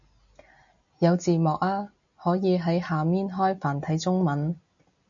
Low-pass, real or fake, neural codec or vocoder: 7.2 kHz; real; none